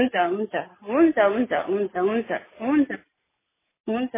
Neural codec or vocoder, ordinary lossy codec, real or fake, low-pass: none; MP3, 16 kbps; real; 3.6 kHz